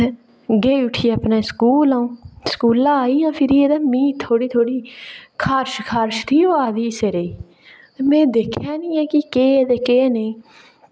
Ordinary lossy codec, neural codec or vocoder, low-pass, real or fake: none; none; none; real